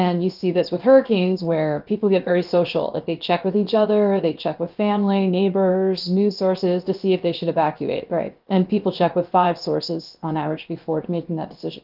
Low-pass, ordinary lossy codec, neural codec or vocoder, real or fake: 5.4 kHz; Opus, 24 kbps; codec, 16 kHz, 0.7 kbps, FocalCodec; fake